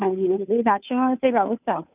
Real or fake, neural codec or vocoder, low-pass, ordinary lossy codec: fake; codec, 16 kHz, 2 kbps, FunCodec, trained on Chinese and English, 25 frames a second; 3.6 kHz; none